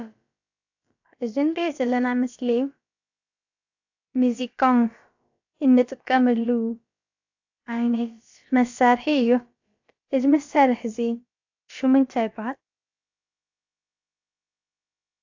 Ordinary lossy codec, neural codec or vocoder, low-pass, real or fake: AAC, 48 kbps; codec, 16 kHz, about 1 kbps, DyCAST, with the encoder's durations; 7.2 kHz; fake